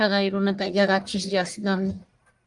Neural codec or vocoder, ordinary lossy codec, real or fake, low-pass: codec, 44.1 kHz, 1.7 kbps, Pupu-Codec; Opus, 32 kbps; fake; 10.8 kHz